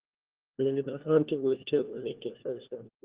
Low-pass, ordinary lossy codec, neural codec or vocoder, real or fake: 3.6 kHz; Opus, 16 kbps; codec, 16 kHz, 1 kbps, FunCodec, trained on LibriTTS, 50 frames a second; fake